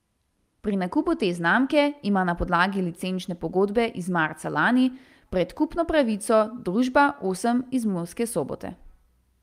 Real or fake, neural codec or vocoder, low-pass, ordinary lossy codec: real; none; 14.4 kHz; Opus, 32 kbps